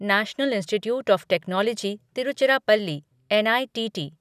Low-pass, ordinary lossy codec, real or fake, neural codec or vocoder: 14.4 kHz; none; real; none